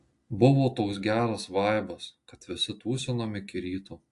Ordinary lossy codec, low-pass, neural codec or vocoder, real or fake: AAC, 48 kbps; 10.8 kHz; none; real